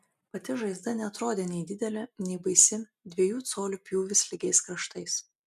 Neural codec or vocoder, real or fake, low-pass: none; real; 14.4 kHz